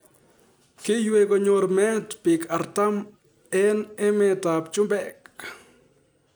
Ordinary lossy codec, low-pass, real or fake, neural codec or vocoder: none; none; real; none